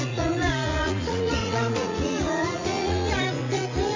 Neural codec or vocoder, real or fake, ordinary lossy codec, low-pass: vocoder, 44.1 kHz, 80 mel bands, Vocos; fake; MP3, 48 kbps; 7.2 kHz